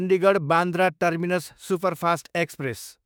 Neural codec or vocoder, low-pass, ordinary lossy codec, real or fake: autoencoder, 48 kHz, 32 numbers a frame, DAC-VAE, trained on Japanese speech; none; none; fake